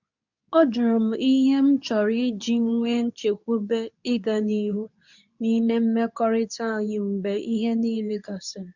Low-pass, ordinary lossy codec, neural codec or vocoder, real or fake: 7.2 kHz; none; codec, 24 kHz, 0.9 kbps, WavTokenizer, medium speech release version 2; fake